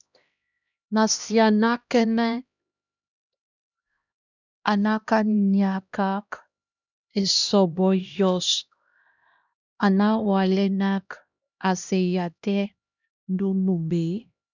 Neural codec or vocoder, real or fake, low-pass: codec, 16 kHz, 1 kbps, X-Codec, HuBERT features, trained on LibriSpeech; fake; 7.2 kHz